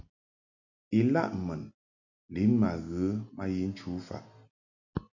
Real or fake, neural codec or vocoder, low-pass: real; none; 7.2 kHz